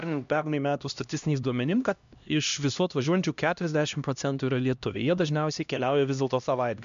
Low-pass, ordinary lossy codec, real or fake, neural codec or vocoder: 7.2 kHz; MP3, 64 kbps; fake; codec, 16 kHz, 1 kbps, X-Codec, HuBERT features, trained on LibriSpeech